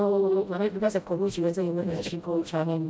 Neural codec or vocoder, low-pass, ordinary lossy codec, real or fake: codec, 16 kHz, 0.5 kbps, FreqCodec, smaller model; none; none; fake